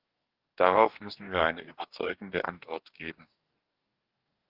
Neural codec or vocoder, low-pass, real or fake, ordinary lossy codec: codec, 44.1 kHz, 2.6 kbps, DAC; 5.4 kHz; fake; Opus, 32 kbps